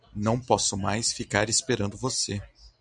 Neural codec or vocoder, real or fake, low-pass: none; real; 10.8 kHz